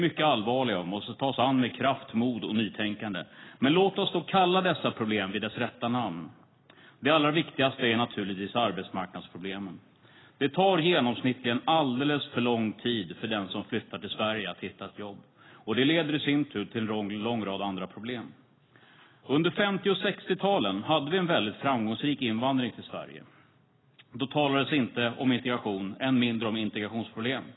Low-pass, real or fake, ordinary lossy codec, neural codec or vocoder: 7.2 kHz; real; AAC, 16 kbps; none